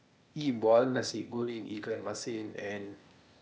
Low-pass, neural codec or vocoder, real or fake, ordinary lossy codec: none; codec, 16 kHz, 0.8 kbps, ZipCodec; fake; none